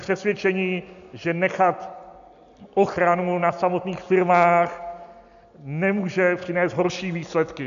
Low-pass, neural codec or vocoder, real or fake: 7.2 kHz; none; real